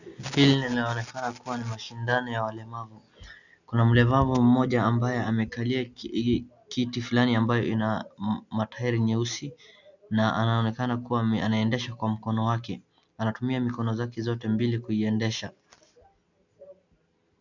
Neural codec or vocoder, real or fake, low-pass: none; real; 7.2 kHz